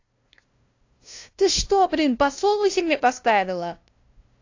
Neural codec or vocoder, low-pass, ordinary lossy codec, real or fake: codec, 16 kHz, 0.5 kbps, FunCodec, trained on LibriTTS, 25 frames a second; 7.2 kHz; AAC, 48 kbps; fake